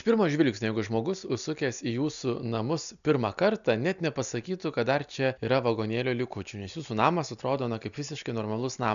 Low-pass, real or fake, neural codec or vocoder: 7.2 kHz; real; none